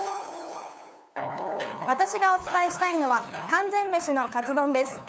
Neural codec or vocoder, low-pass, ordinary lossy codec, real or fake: codec, 16 kHz, 2 kbps, FunCodec, trained on LibriTTS, 25 frames a second; none; none; fake